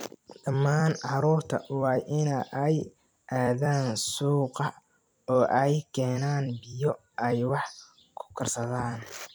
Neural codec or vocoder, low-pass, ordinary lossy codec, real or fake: vocoder, 44.1 kHz, 128 mel bands every 256 samples, BigVGAN v2; none; none; fake